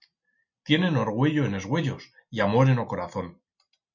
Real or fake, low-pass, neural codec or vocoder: real; 7.2 kHz; none